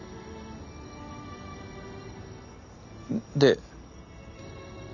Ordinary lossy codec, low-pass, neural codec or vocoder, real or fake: none; 7.2 kHz; none; real